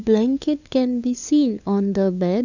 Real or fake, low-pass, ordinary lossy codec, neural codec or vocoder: fake; 7.2 kHz; none; codec, 16 kHz, 2 kbps, FunCodec, trained on LibriTTS, 25 frames a second